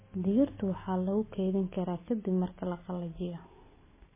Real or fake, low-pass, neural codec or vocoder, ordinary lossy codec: real; 3.6 kHz; none; MP3, 16 kbps